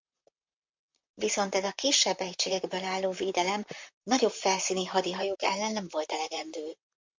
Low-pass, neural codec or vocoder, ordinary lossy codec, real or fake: 7.2 kHz; vocoder, 44.1 kHz, 128 mel bands, Pupu-Vocoder; MP3, 64 kbps; fake